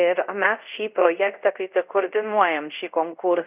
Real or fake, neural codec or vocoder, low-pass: fake; codec, 24 kHz, 0.5 kbps, DualCodec; 3.6 kHz